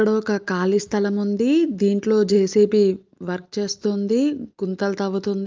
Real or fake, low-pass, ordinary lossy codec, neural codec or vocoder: real; 7.2 kHz; Opus, 24 kbps; none